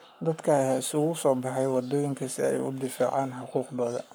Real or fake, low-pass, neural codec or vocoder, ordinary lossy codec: fake; none; codec, 44.1 kHz, 7.8 kbps, Pupu-Codec; none